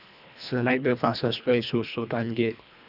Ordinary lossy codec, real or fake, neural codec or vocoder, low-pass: none; fake; codec, 24 kHz, 1.5 kbps, HILCodec; 5.4 kHz